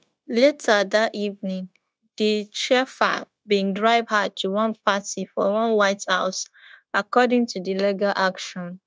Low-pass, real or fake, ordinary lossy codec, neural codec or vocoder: none; fake; none; codec, 16 kHz, 0.9 kbps, LongCat-Audio-Codec